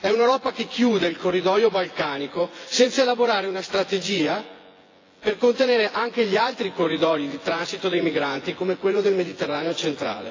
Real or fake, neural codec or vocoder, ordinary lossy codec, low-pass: fake; vocoder, 24 kHz, 100 mel bands, Vocos; AAC, 32 kbps; 7.2 kHz